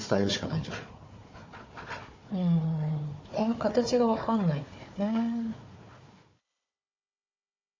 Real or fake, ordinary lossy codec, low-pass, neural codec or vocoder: fake; MP3, 32 kbps; 7.2 kHz; codec, 16 kHz, 4 kbps, FunCodec, trained on Chinese and English, 50 frames a second